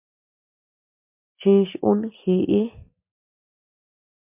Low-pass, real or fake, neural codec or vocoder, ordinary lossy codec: 3.6 kHz; real; none; MP3, 32 kbps